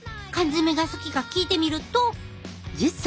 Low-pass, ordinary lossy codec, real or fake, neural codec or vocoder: none; none; real; none